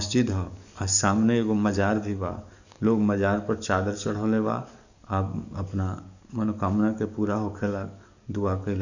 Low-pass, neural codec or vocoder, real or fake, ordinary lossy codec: 7.2 kHz; codec, 44.1 kHz, 7.8 kbps, DAC; fake; none